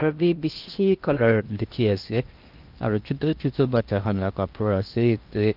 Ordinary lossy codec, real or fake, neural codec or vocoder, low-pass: Opus, 24 kbps; fake; codec, 16 kHz in and 24 kHz out, 0.8 kbps, FocalCodec, streaming, 65536 codes; 5.4 kHz